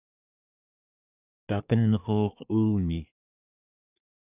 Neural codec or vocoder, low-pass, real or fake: codec, 24 kHz, 1 kbps, SNAC; 3.6 kHz; fake